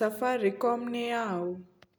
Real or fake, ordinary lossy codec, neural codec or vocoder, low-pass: real; none; none; none